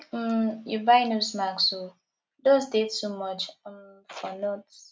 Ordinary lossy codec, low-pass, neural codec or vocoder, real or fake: none; none; none; real